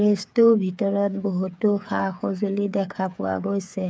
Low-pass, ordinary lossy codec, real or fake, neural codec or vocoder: none; none; fake; codec, 16 kHz, 8 kbps, FreqCodec, smaller model